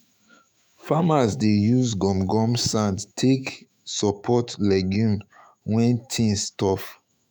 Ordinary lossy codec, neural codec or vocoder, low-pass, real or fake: none; autoencoder, 48 kHz, 128 numbers a frame, DAC-VAE, trained on Japanese speech; none; fake